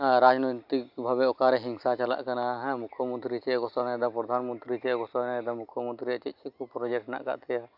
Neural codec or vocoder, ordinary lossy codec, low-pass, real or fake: none; AAC, 48 kbps; 5.4 kHz; real